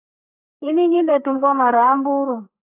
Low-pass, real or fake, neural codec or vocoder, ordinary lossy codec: 3.6 kHz; fake; codec, 32 kHz, 1.9 kbps, SNAC; AAC, 24 kbps